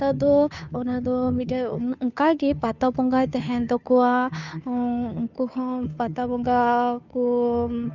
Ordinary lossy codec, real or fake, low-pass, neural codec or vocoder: none; fake; 7.2 kHz; codec, 16 kHz, 2 kbps, FunCodec, trained on Chinese and English, 25 frames a second